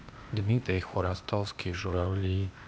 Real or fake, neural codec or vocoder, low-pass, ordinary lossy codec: fake; codec, 16 kHz, 0.8 kbps, ZipCodec; none; none